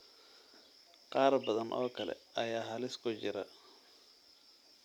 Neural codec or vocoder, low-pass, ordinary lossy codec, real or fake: none; 19.8 kHz; none; real